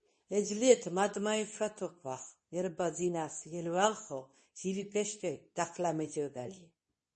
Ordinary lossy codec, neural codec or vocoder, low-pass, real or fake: MP3, 32 kbps; codec, 24 kHz, 0.9 kbps, WavTokenizer, medium speech release version 2; 10.8 kHz; fake